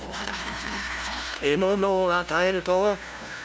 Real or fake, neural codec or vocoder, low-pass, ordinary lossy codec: fake; codec, 16 kHz, 0.5 kbps, FunCodec, trained on LibriTTS, 25 frames a second; none; none